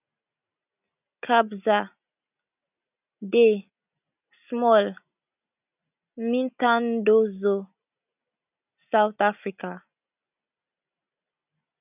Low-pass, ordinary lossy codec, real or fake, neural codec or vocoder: 3.6 kHz; none; real; none